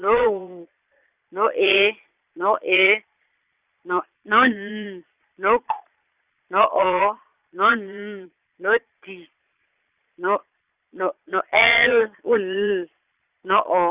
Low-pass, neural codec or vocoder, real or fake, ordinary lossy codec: 3.6 kHz; vocoder, 22.05 kHz, 80 mel bands, Vocos; fake; Opus, 64 kbps